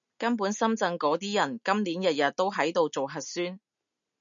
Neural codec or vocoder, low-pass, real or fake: none; 7.2 kHz; real